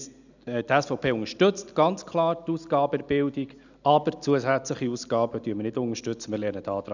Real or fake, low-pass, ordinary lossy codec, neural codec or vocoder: real; 7.2 kHz; none; none